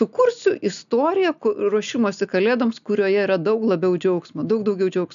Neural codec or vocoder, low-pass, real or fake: none; 7.2 kHz; real